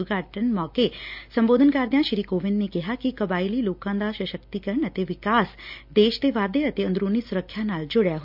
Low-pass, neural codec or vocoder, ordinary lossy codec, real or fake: 5.4 kHz; none; MP3, 48 kbps; real